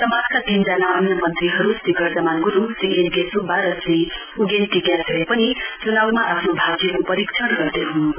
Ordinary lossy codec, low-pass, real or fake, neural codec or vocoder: none; 3.6 kHz; real; none